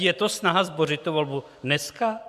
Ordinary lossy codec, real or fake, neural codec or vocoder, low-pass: MP3, 96 kbps; real; none; 14.4 kHz